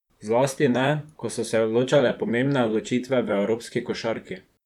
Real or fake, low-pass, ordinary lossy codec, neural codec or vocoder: fake; 19.8 kHz; none; vocoder, 44.1 kHz, 128 mel bands, Pupu-Vocoder